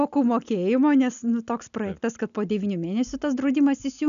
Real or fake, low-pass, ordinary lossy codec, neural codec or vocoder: real; 7.2 kHz; MP3, 96 kbps; none